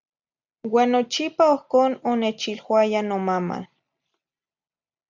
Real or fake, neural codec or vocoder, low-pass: real; none; 7.2 kHz